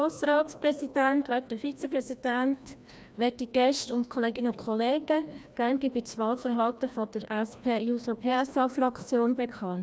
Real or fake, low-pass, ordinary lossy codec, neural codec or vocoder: fake; none; none; codec, 16 kHz, 1 kbps, FreqCodec, larger model